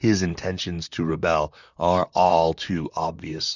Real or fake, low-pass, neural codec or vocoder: fake; 7.2 kHz; vocoder, 44.1 kHz, 128 mel bands, Pupu-Vocoder